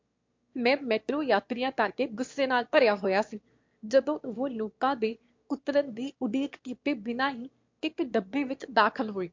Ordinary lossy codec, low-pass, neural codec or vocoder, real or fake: MP3, 48 kbps; 7.2 kHz; autoencoder, 22.05 kHz, a latent of 192 numbers a frame, VITS, trained on one speaker; fake